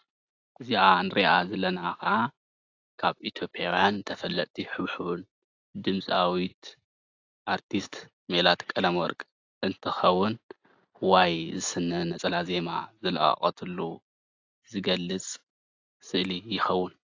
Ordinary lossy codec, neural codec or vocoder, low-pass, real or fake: AAC, 48 kbps; none; 7.2 kHz; real